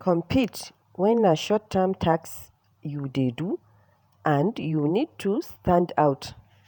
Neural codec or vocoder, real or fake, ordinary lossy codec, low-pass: vocoder, 48 kHz, 128 mel bands, Vocos; fake; none; 19.8 kHz